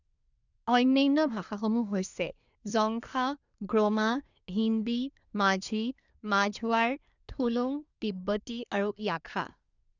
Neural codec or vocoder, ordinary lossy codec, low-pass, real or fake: codec, 24 kHz, 1 kbps, SNAC; none; 7.2 kHz; fake